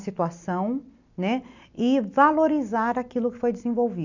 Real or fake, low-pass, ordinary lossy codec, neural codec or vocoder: real; 7.2 kHz; none; none